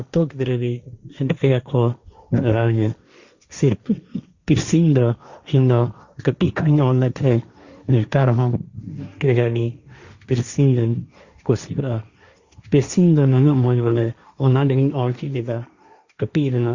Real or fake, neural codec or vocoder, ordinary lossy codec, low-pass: fake; codec, 16 kHz, 1.1 kbps, Voila-Tokenizer; Opus, 64 kbps; 7.2 kHz